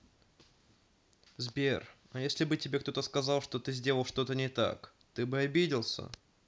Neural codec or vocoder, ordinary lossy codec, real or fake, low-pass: none; none; real; none